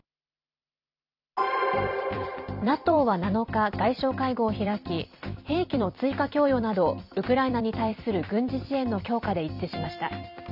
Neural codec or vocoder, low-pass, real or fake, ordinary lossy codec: vocoder, 44.1 kHz, 128 mel bands every 256 samples, BigVGAN v2; 5.4 kHz; fake; none